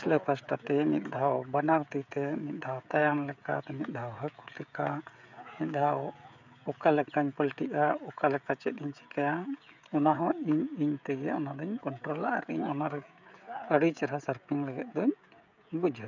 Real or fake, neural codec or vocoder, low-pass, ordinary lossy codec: fake; codec, 16 kHz, 16 kbps, FreqCodec, smaller model; 7.2 kHz; none